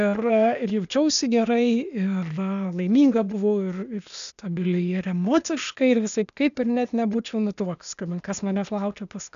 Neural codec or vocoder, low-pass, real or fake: codec, 16 kHz, 0.8 kbps, ZipCodec; 7.2 kHz; fake